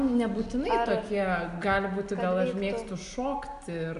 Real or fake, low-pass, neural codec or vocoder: real; 10.8 kHz; none